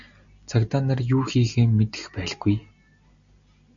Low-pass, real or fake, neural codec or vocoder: 7.2 kHz; real; none